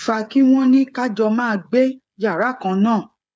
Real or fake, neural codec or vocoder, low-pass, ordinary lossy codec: fake; codec, 16 kHz, 8 kbps, FreqCodec, smaller model; none; none